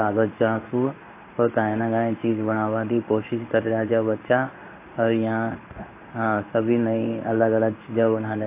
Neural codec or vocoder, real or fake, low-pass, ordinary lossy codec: codec, 16 kHz in and 24 kHz out, 1 kbps, XY-Tokenizer; fake; 3.6 kHz; AAC, 24 kbps